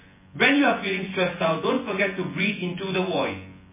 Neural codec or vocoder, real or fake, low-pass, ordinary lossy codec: vocoder, 24 kHz, 100 mel bands, Vocos; fake; 3.6 kHz; MP3, 16 kbps